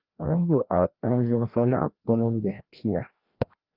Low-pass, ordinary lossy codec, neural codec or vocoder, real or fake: 5.4 kHz; Opus, 32 kbps; codec, 16 kHz, 1 kbps, FreqCodec, larger model; fake